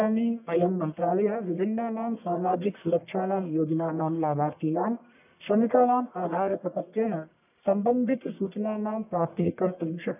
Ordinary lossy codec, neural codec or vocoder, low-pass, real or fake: none; codec, 44.1 kHz, 1.7 kbps, Pupu-Codec; 3.6 kHz; fake